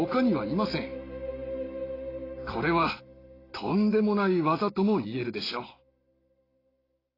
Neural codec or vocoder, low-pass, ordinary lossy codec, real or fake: none; 5.4 kHz; AAC, 24 kbps; real